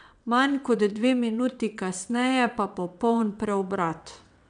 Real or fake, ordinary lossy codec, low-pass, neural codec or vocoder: fake; none; 9.9 kHz; vocoder, 22.05 kHz, 80 mel bands, WaveNeXt